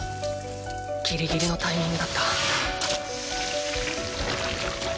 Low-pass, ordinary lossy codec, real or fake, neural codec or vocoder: none; none; real; none